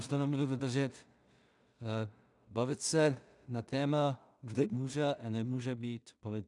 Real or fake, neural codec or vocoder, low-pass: fake; codec, 16 kHz in and 24 kHz out, 0.4 kbps, LongCat-Audio-Codec, two codebook decoder; 10.8 kHz